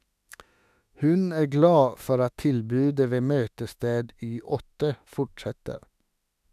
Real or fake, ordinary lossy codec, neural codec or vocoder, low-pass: fake; none; autoencoder, 48 kHz, 32 numbers a frame, DAC-VAE, trained on Japanese speech; 14.4 kHz